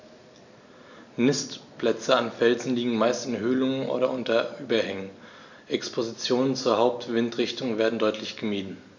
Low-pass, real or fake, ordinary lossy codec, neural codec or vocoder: 7.2 kHz; real; none; none